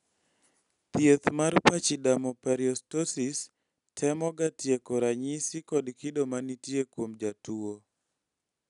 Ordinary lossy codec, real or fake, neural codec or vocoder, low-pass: none; real; none; 10.8 kHz